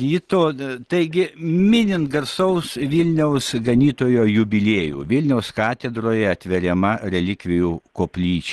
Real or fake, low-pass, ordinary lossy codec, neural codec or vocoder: real; 10.8 kHz; Opus, 16 kbps; none